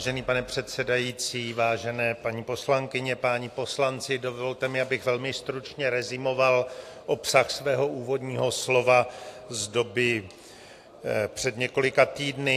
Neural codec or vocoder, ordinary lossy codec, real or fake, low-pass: none; AAC, 64 kbps; real; 14.4 kHz